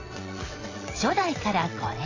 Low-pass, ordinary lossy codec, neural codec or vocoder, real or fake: 7.2 kHz; AAC, 32 kbps; vocoder, 22.05 kHz, 80 mel bands, WaveNeXt; fake